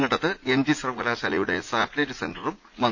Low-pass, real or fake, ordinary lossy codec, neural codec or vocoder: 7.2 kHz; fake; none; vocoder, 44.1 kHz, 80 mel bands, Vocos